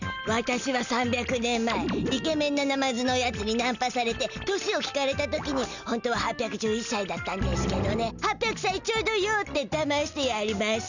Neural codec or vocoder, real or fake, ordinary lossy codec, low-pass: none; real; none; 7.2 kHz